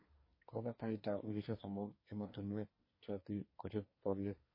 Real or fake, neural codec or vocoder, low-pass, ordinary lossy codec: fake; codec, 24 kHz, 1 kbps, SNAC; 5.4 kHz; MP3, 24 kbps